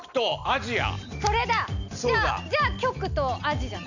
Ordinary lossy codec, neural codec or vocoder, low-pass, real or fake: none; none; 7.2 kHz; real